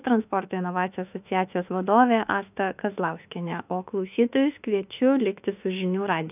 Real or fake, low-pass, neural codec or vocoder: fake; 3.6 kHz; autoencoder, 48 kHz, 32 numbers a frame, DAC-VAE, trained on Japanese speech